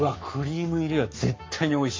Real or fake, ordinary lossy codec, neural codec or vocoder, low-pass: fake; MP3, 48 kbps; codec, 44.1 kHz, 7.8 kbps, Pupu-Codec; 7.2 kHz